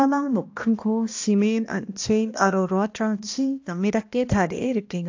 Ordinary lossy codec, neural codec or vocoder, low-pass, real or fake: AAC, 48 kbps; codec, 16 kHz, 1 kbps, X-Codec, HuBERT features, trained on balanced general audio; 7.2 kHz; fake